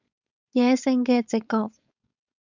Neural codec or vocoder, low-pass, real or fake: codec, 16 kHz, 4.8 kbps, FACodec; 7.2 kHz; fake